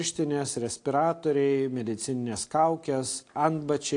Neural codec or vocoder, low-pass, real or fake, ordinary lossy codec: none; 9.9 kHz; real; AAC, 48 kbps